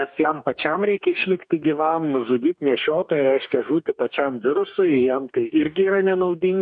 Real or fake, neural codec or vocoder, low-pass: fake; codec, 44.1 kHz, 2.6 kbps, DAC; 9.9 kHz